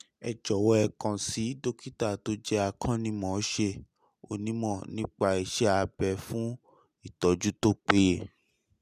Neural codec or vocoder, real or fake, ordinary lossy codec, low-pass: none; real; none; 14.4 kHz